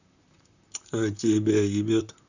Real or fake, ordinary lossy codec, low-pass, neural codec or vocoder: fake; none; 7.2 kHz; vocoder, 44.1 kHz, 128 mel bands, Pupu-Vocoder